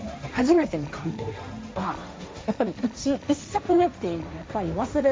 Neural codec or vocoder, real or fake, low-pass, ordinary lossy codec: codec, 16 kHz, 1.1 kbps, Voila-Tokenizer; fake; none; none